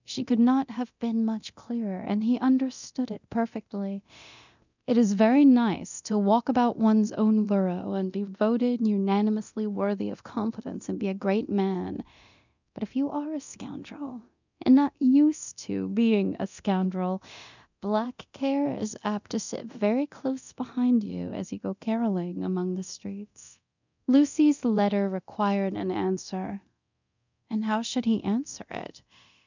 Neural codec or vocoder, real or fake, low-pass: codec, 24 kHz, 0.9 kbps, DualCodec; fake; 7.2 kHz